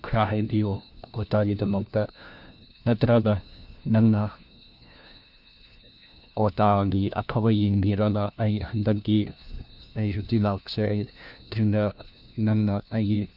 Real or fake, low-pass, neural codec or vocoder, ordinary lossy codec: fake; 5.4 kHz; codec, 16 kHz, 1 kbps, FunCodec, trained on LibriTTS, 50 frames a second; none